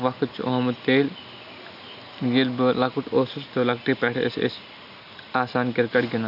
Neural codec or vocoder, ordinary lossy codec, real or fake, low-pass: none; none; real; 5.4 kHz